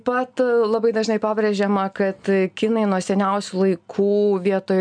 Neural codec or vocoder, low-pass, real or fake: none; 9.9 kHz; real